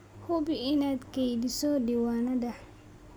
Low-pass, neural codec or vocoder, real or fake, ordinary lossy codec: none; none; real; none